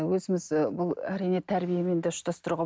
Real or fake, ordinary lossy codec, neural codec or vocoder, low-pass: real; none; none; none